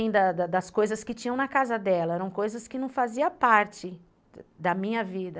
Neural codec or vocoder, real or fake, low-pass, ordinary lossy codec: none; real; none; none